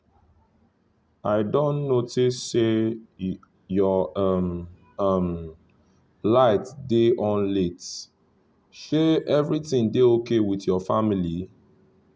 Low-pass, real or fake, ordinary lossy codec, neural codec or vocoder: none; real; none; none